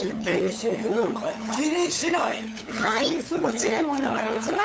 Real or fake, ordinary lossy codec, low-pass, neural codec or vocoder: fake; none; none; codec, 16 kHz, 8 kbps, FunCodec, trained on LibriTTS, 25 frames a second